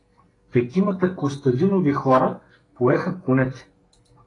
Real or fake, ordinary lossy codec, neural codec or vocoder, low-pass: fake; AAC, 32 kbps; codec, 44.1 kHz, 2.6 kbps, SNAC; 10.8 kHz